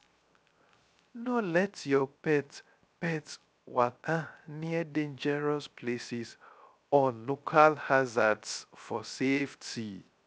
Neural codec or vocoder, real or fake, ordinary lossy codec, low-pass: codec, 16 kHz, 0.3 kbps, FocalCodec; fake; none; none